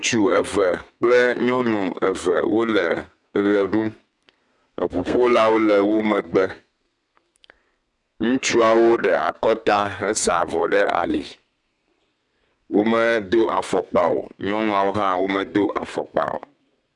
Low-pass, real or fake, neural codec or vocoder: 10.8 kHz; fake; codec, 32 kHz, 1.9 kbps, SNAC